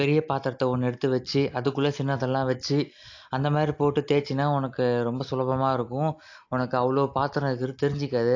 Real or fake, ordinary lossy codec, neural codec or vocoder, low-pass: real; AAC, 48 kbps; none; 7.2 kHz